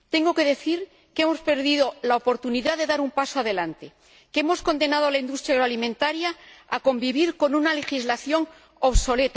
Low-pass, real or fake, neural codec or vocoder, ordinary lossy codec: none; real; none; none